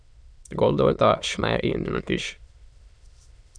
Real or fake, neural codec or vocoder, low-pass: fake; autoencoder, 22.05 kHz, a latent of 192 numbers a frame, VITS, trained on many speakers; 9.9 kHz